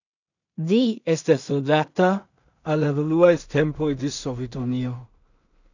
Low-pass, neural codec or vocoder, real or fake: 7.2 kHz; codec, 16 kHz in and 24 kHz out, 0.4 kbps, LongCat-Audio-Codec, two codebook decoder; fake